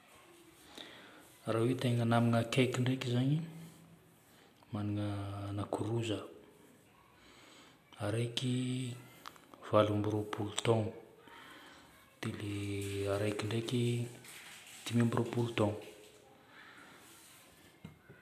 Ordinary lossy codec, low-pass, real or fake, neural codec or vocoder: none; 14.4 kHz; real; none